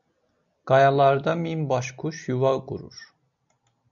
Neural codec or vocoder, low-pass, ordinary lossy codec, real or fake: none; 7.2 kHz; MP3, 96 kbps; real